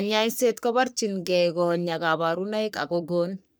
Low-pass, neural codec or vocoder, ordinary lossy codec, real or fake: none; codec, 44.1 kHz, 3.4 kbps, Pupu-Codec; none; fake